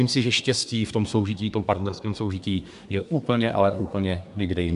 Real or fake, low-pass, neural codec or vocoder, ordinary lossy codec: fake; 10.8 kHz; codec, 24 kHz, 1 kbps, SNAC; MP3, 96 kbps